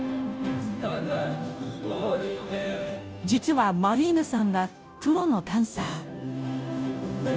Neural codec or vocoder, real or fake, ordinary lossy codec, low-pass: codec, 16 kHz, 0.5 kbps, FunCodec, trained on Chinese and English, 25 frames a second; fake; none; none